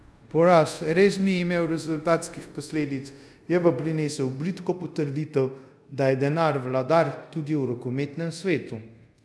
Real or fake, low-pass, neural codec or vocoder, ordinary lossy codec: fake; none; codec, 24 kHz, 0.5 kbps, DualCodec; none